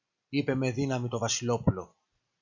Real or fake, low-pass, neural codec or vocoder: real; 7.2 kHz; none